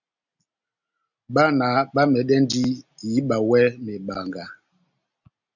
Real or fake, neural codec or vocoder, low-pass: real; none; 7.2 kHz